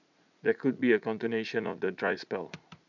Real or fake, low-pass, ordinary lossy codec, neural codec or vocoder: fake; 7.2 kHz; none; vocoder, 44.1 kHz, 80 mel bands, Vocos